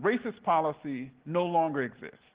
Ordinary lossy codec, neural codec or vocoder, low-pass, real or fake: Opus, 16 kbps; none; 3.6 kHz; real